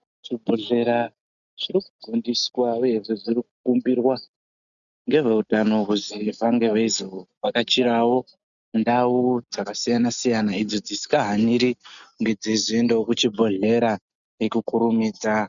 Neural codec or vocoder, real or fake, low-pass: none; real; 7.2 kHz